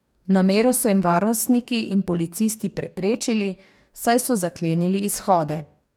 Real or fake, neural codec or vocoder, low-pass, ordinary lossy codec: fake; codec, 44.1 kHz, 2.6 kbps, DAC; 19.8 kHz; none